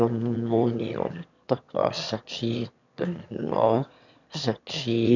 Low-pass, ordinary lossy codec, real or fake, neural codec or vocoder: 7.2 kHz; none; fake; autoencoder, 22.05 kHz, a latent of 192 numbers a frame, VITS, trained on one speaker